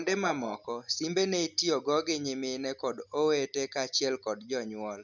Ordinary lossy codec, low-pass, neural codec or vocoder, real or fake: none; 7.2 kHz; none; real